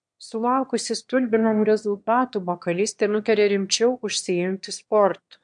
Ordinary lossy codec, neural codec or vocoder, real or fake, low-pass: MP3, 64 kbps; autoencoder, 22.05 kHz, a latent of 192 numbers a frame, VITS, trained on one speaker; fake; 9.9 kHz